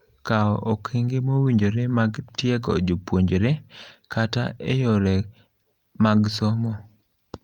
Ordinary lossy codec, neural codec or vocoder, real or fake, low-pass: Opus, 32 kbps; none; real; 19.8 kHz